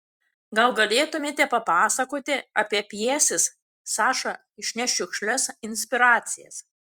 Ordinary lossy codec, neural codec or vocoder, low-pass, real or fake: Opus, 64 kbps; vocoder, 44.1 kHz, 128 mel bands every 256 samples, BigVGAN v2; 19.8 kHz; fake